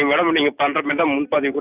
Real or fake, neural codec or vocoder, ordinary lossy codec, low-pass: real; none; Opus, 64 kbps; 3.6 kHz